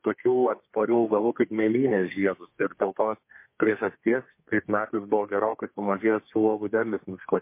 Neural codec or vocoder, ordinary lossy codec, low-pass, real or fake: codec, 32 kHz, 1.9 kbps, SNAC; MP3, 24 kbps; 3.6 kHz; fake